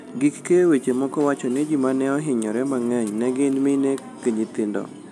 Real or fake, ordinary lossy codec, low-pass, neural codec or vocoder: real; none; none; none